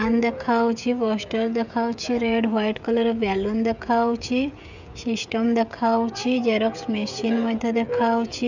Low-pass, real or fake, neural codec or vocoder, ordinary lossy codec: 7.2 kHz; fake; codec, 16 kHz, 16 kbps, FreqCodec, smaller model; none